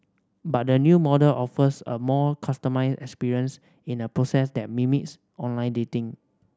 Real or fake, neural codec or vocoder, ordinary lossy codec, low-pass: real; none; none; none